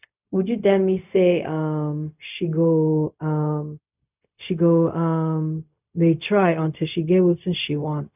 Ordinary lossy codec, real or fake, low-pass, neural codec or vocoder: none; fake; 3.6 kHz; codec, 16 kHz, 0.4 kbps, LongCat-Audio-Codec